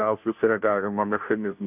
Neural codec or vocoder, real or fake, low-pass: codec, 16 kHz, 0.5 kbps, FunCodec, trained on Chinese and English, 25 frames a second; fake; 3.6 kHz